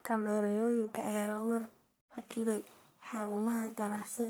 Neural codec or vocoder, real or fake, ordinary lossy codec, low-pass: codec, 44.1 kHz, 1.7 kbps, Pupu-Codec; fake; none; none